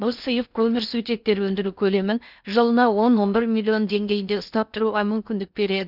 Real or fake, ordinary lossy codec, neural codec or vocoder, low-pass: fake; none; codec, 16 kHz in and 24 kHz out, 0.6 kbps, FocalCodec, streaming, 4096 codes; 5.4 kHz